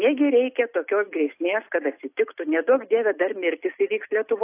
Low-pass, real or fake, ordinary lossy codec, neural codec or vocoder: 3.6 kHz; real; AAC, 24 kbps; none